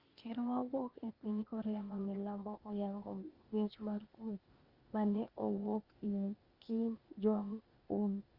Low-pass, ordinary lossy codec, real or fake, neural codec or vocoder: 5.4 kHz; Opus, 32 kbps; fake; codec, 16 kHz, 0.8 kbps, ZipCodec